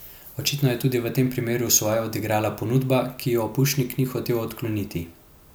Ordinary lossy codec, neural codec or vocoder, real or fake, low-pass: none; none; real; none